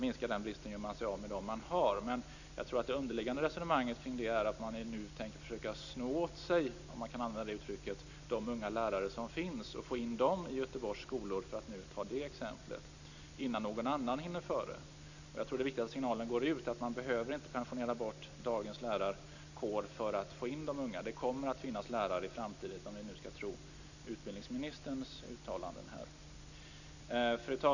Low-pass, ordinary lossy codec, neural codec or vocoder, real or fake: 7.2 kHz; none; none; real